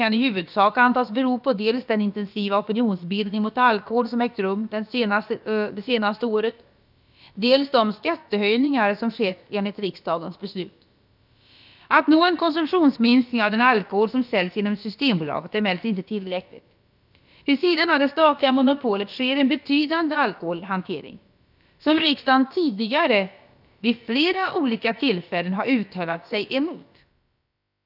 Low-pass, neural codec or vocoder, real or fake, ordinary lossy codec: 5.4 kHz; codec, 16 kHz, about 1 kbps, DyCAST, with the encoder's durations; fake; none